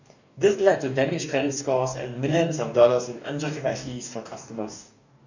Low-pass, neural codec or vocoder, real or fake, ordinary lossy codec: 7.2 kHz; codec, 44.1 kHz, 2.6 kbps, DAC; fake; none